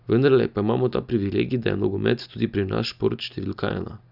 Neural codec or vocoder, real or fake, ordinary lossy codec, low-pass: none; real; none; 5.4 kHz